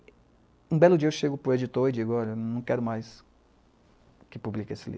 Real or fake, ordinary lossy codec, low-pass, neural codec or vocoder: real; none; none; none